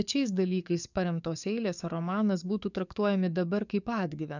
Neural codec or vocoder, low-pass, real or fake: codec, 44.1 kHz, 7.8 kbps, DAC; 7.2 kHz; fake